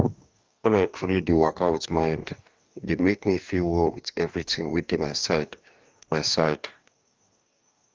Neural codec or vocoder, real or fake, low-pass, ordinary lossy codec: codec, 44.1 kHz, 2.6 kbps, DAC; fake; 7.2 kHz; Opus, 32 kbps